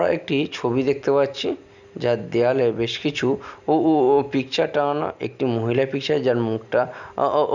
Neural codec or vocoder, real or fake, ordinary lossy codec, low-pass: none; real; none; 7.2 kHz